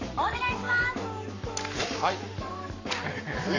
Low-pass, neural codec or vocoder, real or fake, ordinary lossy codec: 7.2 kHz; codec, 44.1 kHz, 7.8 kbps, Pupu-Codec; fake; none